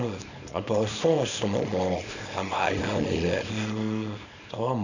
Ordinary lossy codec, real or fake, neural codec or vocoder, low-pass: none; fake; codec, 24 kHz, 0.9 kbps, WavTokenizer, small release; 7.2 kHz